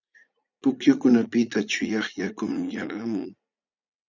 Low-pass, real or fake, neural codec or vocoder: 7.2 kHz; fake; vocoder, 44.1 kHz, 80 mel bands, Vocos